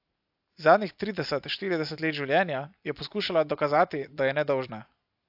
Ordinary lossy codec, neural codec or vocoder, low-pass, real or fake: AAC, 48 kbps; none; 5.4 kHz; real